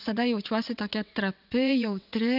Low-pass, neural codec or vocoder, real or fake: 5.4 kHz; vocoder, 22.05 kHz, 80 mel bands, WaveNeXt; fake